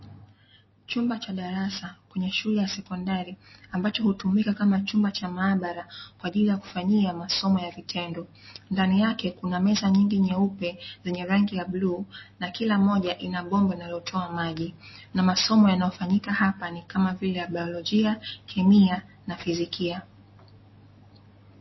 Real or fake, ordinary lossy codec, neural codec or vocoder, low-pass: real; MP3, 24 kbps; none; 7.2 kHz